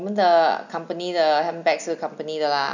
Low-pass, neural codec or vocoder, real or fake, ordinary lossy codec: 7.2 kHz; none; real; none